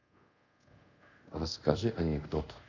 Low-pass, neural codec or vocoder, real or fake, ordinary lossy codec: 7.2 kHz; codec, 24 kHz, 0.5 kbps, DualCodec; fake; none